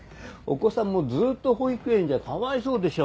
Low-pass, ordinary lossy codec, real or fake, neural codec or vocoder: none; none; real; none